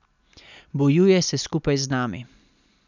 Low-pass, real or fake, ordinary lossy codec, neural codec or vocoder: 7.2 kHz; real; none; none